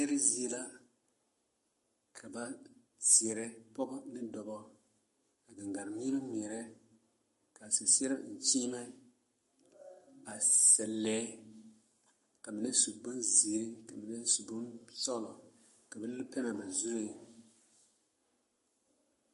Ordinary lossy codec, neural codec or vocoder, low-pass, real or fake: MP3, 48 kbps; codec, 44.1 kHz, 7.8 kbps, Pupu-Codec; 14.4 kHz; fake